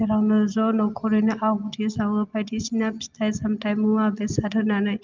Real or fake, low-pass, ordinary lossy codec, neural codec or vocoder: real; 7.2 kHz; Opus, 32 kbps; none